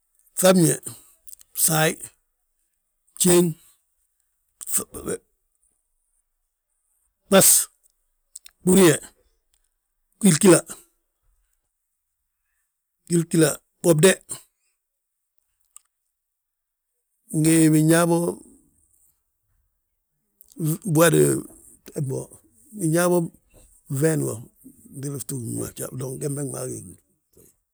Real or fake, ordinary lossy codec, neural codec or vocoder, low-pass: real; none; none; none